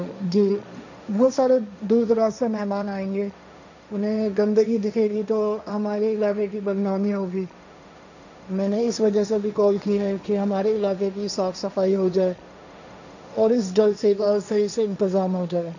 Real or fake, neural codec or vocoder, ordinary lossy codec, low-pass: fake; codec, 16 kHz, 1.1 kbps, Voila-Tokenizer; none; 7.2 kHz